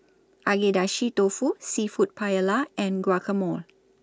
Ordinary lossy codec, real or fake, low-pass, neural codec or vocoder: none; real; none; none